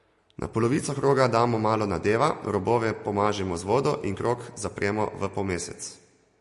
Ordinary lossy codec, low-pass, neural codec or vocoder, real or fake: MP3, 48 kbps; 14.4 kHz; none; real